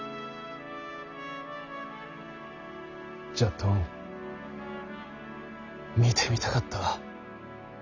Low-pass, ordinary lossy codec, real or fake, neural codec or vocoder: 7.2 kHz; none; real; none